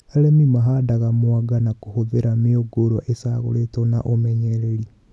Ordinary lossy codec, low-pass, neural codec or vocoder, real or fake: none; none; none; real